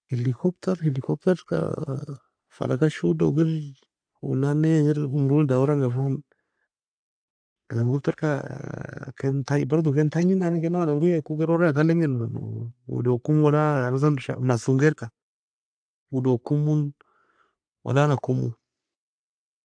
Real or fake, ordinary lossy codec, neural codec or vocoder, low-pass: fake; AAC, 64 kbps; codec, 44.1 kHz, 3.4 kbps, Pupu-Codec; 9.9 kHz